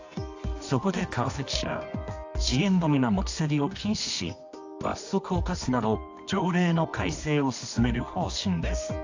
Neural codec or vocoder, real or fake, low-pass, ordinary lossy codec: codec, 24 kHz, 0.9 kbps, WavTokenizer, medium music audio release; fake; 7.2 kHz; AAC, 48 kbps